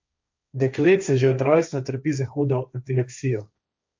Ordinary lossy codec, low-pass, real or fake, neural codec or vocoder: MP3, 64 kbps; 7.2 kHz; fake; codec, 16 kHz, 1.1 kbps, Voila-Tokenizer